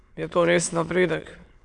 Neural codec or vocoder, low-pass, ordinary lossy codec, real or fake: autoencoder, 22.05 kHz, a latent of 192 numbers a frame, VITS, trained on many speakers; 9.9 kHz; Opus, 64 kbps; fake